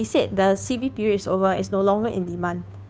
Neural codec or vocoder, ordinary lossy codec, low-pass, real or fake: codec, 16 kHz, 2 kbps, FunCodec, trained on Chinese and English, 25 frames a second; none; none; fake